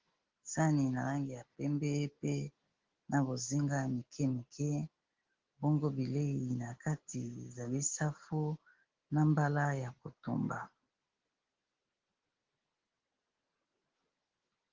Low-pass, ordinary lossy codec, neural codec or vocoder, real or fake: 7.2 kHz; Opus, 16 kbps; none; real